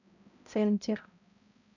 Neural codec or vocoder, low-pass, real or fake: codec, 16 kHz, 0.5 kbps, X-Codec, HuBERT features, trained on balanced general audio; 7.2 kHz; fake